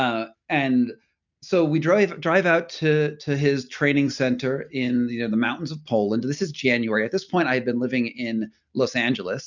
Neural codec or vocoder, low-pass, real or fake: none; 7.2 kHz; real